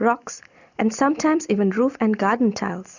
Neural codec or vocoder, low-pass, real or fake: none; 7.2 kHz; real